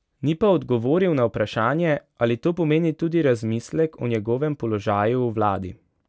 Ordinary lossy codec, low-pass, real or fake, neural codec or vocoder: none; none; real; none